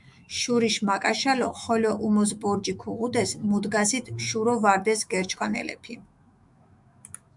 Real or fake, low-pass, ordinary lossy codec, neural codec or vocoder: fake; 10.8 kHz; MP3, 96 kbps; autoencoder, 48 kHz, 128 numbers a frame, DAC-VAE, trained on Japanese speech